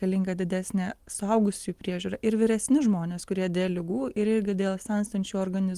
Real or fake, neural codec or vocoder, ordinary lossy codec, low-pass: real; none; Opus, 24 kbps; 14.4 kHz